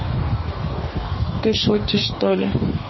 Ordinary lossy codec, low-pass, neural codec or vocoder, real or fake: MP3, 24 kbps; 7.2 kHz; codec, 44.1 kHz, 2.6 kbps, DAC; fake